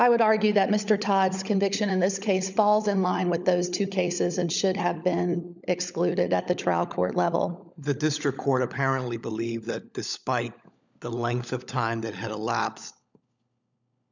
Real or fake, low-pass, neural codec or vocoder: fake; 7.2 kHz; codec, 16 kHz, 16 kbps, FunCodec, trained on LibriTTS, 50 frames a second